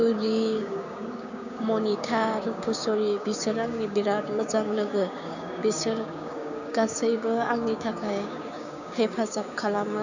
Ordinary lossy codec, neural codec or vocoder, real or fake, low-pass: none; codec, 16 kHz, 6 kbps, DAC; fake; 7.2 kHz